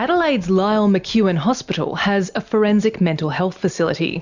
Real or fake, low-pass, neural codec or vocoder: real; 7.2 kHz; none